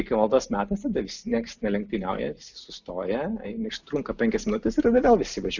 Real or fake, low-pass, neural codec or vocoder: real; 7.2 kHz; none